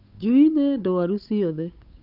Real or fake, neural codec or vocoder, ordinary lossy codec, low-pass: fake; codec, 16 kHz, 8 kbps, FunCodec, trained on Chinese and English, 25 frames a second; none; 5.4 kHz